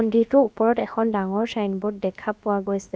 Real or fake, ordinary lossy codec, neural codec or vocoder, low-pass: fake; none; codec, 16 kHz, about 1 kbps, DyCAST, with the encoder's durations; none